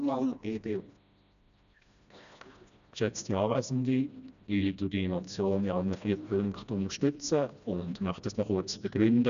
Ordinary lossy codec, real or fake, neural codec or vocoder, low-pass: none; fake; codec, 16 kHz, 1 kbps, FreqCodec, smaller model; 7.2 kHz